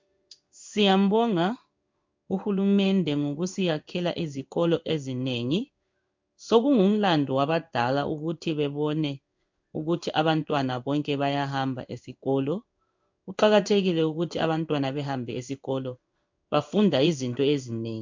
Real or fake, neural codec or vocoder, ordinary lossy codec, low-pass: fake; codec, 16 kHz in and 24 kHz out, 1 kbps, XY-Tokenizer; MP3, 64 kbps; 7.2 kHz